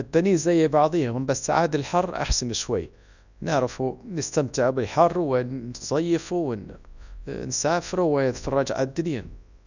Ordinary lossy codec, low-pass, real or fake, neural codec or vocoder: none; 7.2 kHz; fake; codec, 24 kHz, 0.9 kbps, WavTokenizer, large speech release